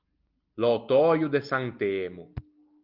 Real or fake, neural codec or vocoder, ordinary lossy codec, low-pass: real; none; Opus, 24 kbps; 5.4 kHz